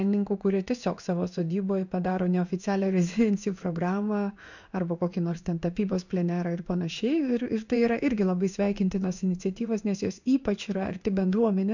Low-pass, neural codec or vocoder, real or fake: 7.2 kHz; codec, 16 kHz in and 24 kHz out, 1 kbps, XY-Tokenizer; fake